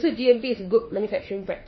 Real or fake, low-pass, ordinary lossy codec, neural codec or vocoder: fake; 7.2 kHz; MP3, 24 kbps; autoencoder, 48 kHz, 32 numbers a frame, DAC-VAE, trained on Japanese speech